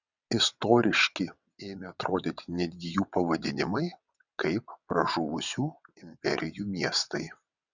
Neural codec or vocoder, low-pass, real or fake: vocoder, 22.05 kHz, 80 mel bands, WaveNeXt; 7.2 kHz; fake